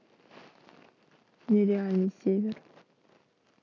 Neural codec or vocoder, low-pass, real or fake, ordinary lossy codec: none; 7.2 kHz; real; none